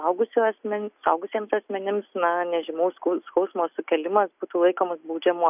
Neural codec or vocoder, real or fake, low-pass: none; real; 3.6 kHz